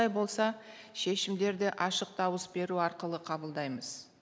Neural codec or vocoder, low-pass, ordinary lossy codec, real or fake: none; none; none; real